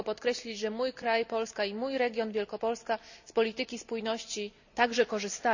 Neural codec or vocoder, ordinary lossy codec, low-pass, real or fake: none; none; 7.2 kHz; real